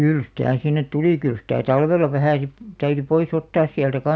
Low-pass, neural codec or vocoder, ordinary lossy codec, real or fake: none; none; none; real